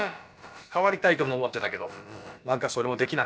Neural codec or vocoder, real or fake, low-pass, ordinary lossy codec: codec, 16 kHz, about 1 kbps, DyCAST, with the encoder's durations; fake; none; none